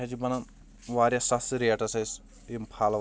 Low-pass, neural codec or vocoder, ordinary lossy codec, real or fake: none; none; none; real